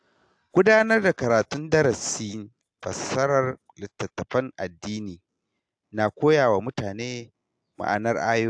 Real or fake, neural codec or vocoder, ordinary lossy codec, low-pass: real; none; AAC, 64 kbps; 9.9 kHz